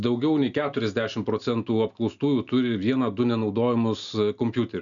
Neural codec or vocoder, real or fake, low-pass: none; real; 7.2 kHz